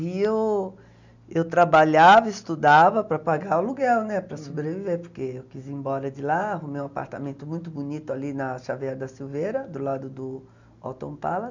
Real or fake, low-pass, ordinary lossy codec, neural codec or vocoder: real; 7.2 kHz; none; none